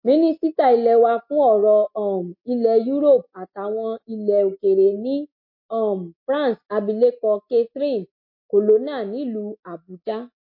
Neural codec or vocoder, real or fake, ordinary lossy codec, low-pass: none; real; MP3, 32 kbps; 5.4 kHz